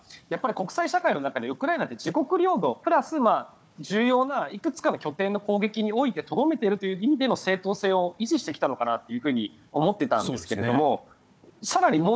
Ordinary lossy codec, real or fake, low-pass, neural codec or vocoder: none; fake; none; codec, 16 kHz, 4 kbps, FunCodec, trained on Chinese and English, 50 frames a second